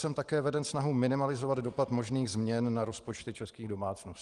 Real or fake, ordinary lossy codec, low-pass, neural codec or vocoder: real; Opus, 24 kbps; 10.8 kHz; none